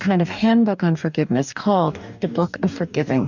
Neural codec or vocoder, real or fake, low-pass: codec, 44.1 kHz, 2.6 kbps, DAC; fake; 7.2 kHz